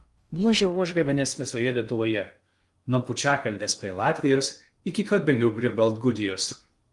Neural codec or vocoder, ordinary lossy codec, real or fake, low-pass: codec, 16 kHz in and 24 kHz out, 0.6 kbps, FocalCodec, streaming, 2048 codes; Opus, 32 kbps; fake; 10.8 kHz